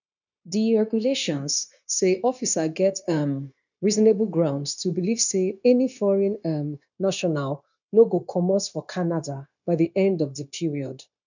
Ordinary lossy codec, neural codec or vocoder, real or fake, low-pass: none; codec, 16 kHz, 0.9 kbps, LongCat-Audio-Codec; fake; 7.2 kHz